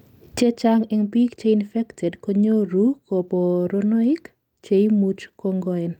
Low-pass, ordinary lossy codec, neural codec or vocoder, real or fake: 19.8 kHz; Opus, 32 kbps; none; real